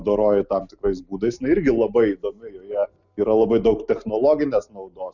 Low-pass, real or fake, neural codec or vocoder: 7.2 kHz; real; none